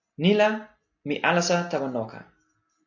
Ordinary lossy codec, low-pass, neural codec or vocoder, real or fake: AAC, 48 kbps; 7.2 kHz; none; real